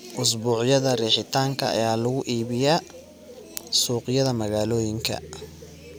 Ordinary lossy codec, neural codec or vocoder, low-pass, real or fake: none; none; none; real